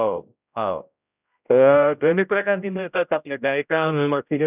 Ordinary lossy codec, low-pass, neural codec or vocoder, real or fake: none; 3.6 kHz; codec, 16 kHz, 0.5 kbps, X-Codec, HuBERT features, trained on general audio; fake